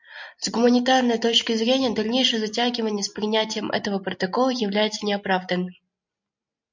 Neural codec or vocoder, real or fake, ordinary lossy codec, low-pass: none; real; MP3, 64 kbps; 7.2 kHz